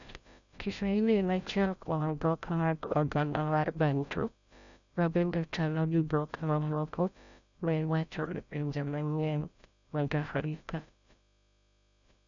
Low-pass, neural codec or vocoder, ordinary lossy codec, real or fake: 7.2 kHz; codec, 16 kHz, 0.5 kbps, FreqCodec, larger model; none; fake